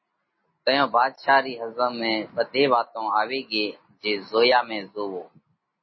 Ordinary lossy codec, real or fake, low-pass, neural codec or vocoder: MP3, 24 kbps; real; 7.2 kHz; none